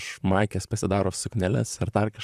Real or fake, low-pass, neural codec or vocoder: fake; 14.4 kHz; vocoder, 44.1 kHz, 128 mel bands, Pupu-Vocoder